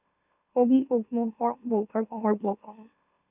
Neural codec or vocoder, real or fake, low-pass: autoencoder, 44.1 kHz, a latent of 192 numbers a frame, MeloTTS; fake; 3.6 kHz